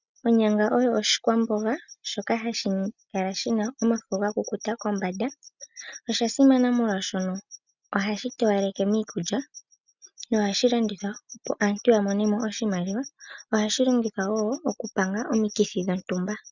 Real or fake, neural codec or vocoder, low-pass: real; none; 7.2 kHz